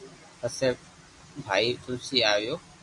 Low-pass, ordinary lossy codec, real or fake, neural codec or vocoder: 10.8 kHz; MP3, 64 kbps; real; none